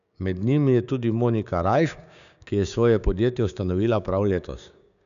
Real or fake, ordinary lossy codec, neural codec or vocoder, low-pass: fake; none; codec, 16 kHz, 6 kbps, DAC; 7.2 kHz